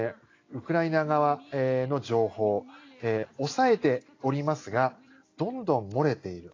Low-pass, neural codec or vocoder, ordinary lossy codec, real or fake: 7.2 kHz; none; AAC, 32 kbps; real